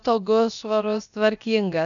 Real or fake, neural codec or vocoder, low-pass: fake; codec, 16 kHz, about 1 kbps, DyCAST, with the encoder's durations; 7.2 kHz